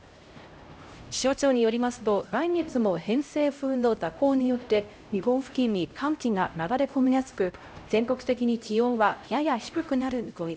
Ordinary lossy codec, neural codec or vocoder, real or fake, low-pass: none; codec, 16 kHz, 0.5 kbps, X-Codec, HuBERT features, trained on LibriSpeech; fake; none